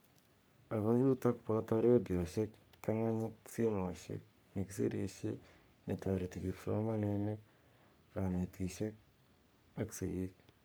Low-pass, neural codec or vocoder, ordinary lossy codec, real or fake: none; codec, 44.1 kHz, 3.4 kbps, Pupu-Codec; none; fake